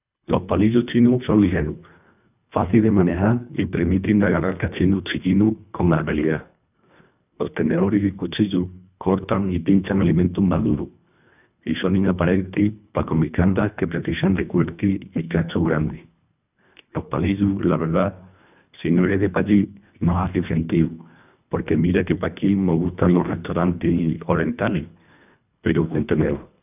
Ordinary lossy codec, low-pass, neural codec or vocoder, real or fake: none; 3.6 kHz; codec, 24 kHz, 1.5 kbps, HILCodec; fake